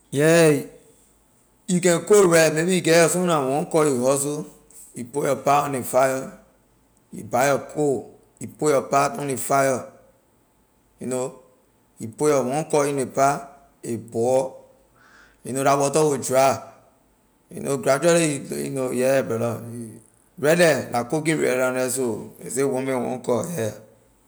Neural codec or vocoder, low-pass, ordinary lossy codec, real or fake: none; none; none; real